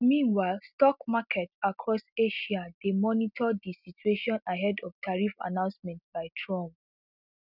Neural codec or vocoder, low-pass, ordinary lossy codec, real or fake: none; 5.4 kHz; none; real